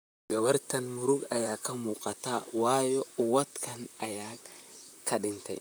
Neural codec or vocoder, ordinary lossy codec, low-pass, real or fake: vocoder, 44.1 kHz, 128 mel bands, Pupu-Vocoder; none; none; fake